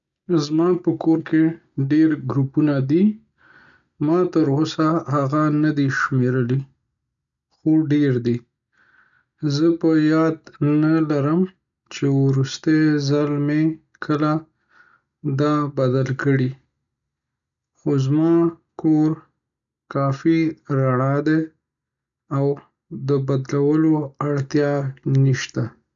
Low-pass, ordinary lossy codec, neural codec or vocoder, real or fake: 7.2 kHz; none; none; real